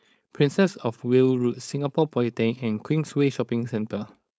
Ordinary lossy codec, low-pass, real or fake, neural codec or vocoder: none; none; fake; codec, 16 kHz, 4.8 kbps, FACodec